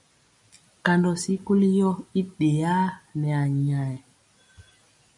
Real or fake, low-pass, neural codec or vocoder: real; 10.8 kHz; none